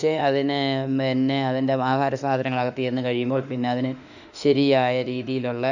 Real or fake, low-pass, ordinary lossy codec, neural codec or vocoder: fake; 7.2 kHz; none; autoencoder, 48 kHz, 32 numbers a frame, DAC-VAE, trained on Japanese speech